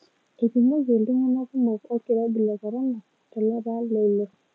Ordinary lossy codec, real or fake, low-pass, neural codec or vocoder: none; real; none; none